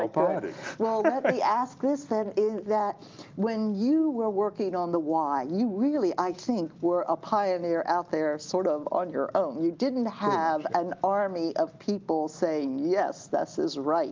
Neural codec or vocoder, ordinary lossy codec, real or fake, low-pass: none; Opus, 32 kbps; real; 7.2 kHz